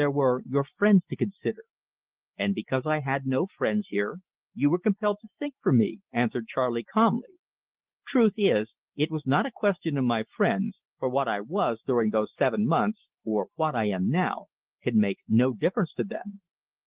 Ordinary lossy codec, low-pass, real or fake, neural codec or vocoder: Opus, 16 kbps; 3.6 kHz; real; none